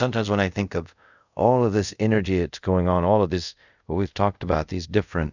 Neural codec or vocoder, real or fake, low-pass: codec, 24 kHz, 0.5 kbps, DualCodec; fake; 7.2 kHz